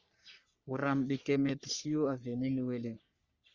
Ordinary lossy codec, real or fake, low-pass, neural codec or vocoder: Opus, 64 kbps; fake; 7.2 kHz; codec, 44.1 kHz, 3.4 kbps, Pupu-Codec